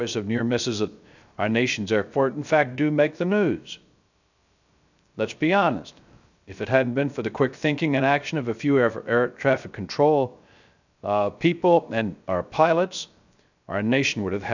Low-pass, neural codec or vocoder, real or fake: 7.2 kHz; codec, 16 kHz, 0.3 kbps, FocalCodec; fake